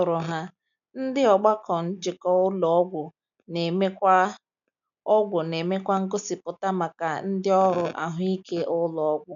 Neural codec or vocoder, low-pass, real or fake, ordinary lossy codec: none; 7.2 kHz; real; none